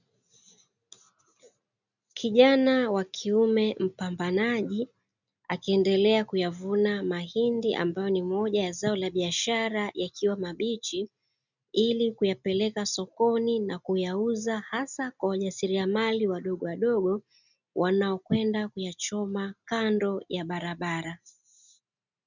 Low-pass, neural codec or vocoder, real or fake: 7.2 kHz; none; real